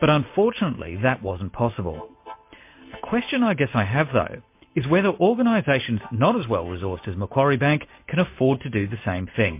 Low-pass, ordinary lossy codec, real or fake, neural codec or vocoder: 3.6 kHz; MP3, 24 kbps; real; none